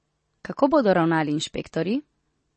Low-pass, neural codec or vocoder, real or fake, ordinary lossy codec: 9.9 kHz; none; real; MP3, 32 kbps